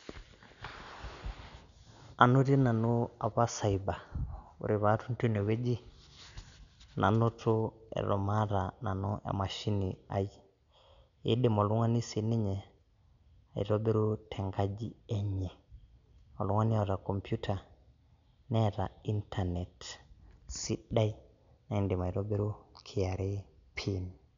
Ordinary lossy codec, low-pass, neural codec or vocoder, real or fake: none; 7.2 kHz; none; real